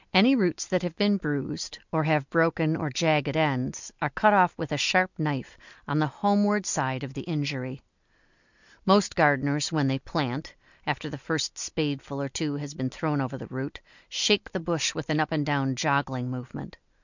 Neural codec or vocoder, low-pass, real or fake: none; 7.2 kHz; real